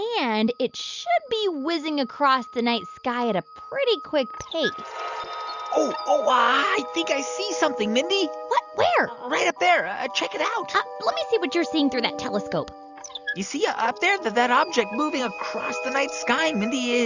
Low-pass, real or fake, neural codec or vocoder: 7.2 kHz; real; none